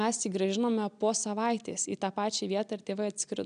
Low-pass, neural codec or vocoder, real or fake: 9.9 kHz; none; real